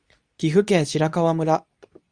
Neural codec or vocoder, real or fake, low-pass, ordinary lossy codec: codec, 24 kHz, 0.9 kbps, WavTokenizer, medium speech release version 2; fake; 9.9 kHz; Opus, 64 kbps